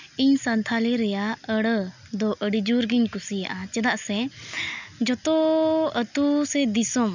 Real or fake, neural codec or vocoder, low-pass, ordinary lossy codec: real; none; 7.2 kHz; none